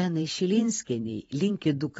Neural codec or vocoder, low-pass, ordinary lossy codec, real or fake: vocoder, 44.1 kHz, 128 mel bands every 512 samples, BigVGAN v2; 19.8 kHz; AAC, 24 kbps; fake